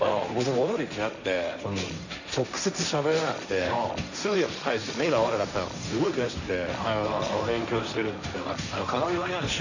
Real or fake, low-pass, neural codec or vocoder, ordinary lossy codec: fake; 7.2 kHz; codec, 16 kHz, 1.1 kbps, Voila-Tokenizer; none